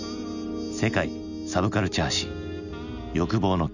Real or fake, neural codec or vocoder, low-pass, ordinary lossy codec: real; none; 7.2 kHz; none